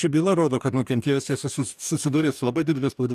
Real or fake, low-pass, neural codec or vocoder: fake; 14.4 kHz; codec, 44.1 kHz, 2.6 kbps, DAC